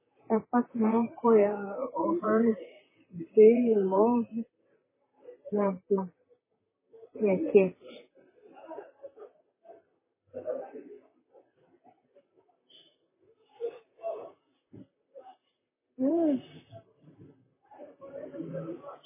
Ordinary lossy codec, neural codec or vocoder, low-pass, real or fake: MP3, 16 kbps; vocoder, 44.1 kHz, 128 mel bands, Pupu-Vocoder; 3.6 kHz; fake